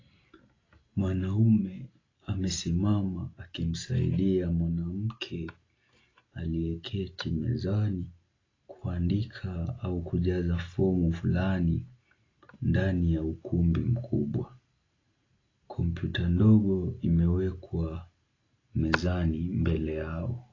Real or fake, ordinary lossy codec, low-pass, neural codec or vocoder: real; AAC, 32 kbps; 7.2 kHz; none